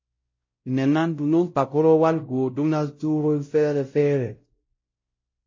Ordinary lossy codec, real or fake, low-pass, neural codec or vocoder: MP3, 32 kbps; fake; 7.2 kHz; codec, 16 kHz, 0.5 kbps, X-Codec, WavLM features, trained on Multilingual LibriSpeech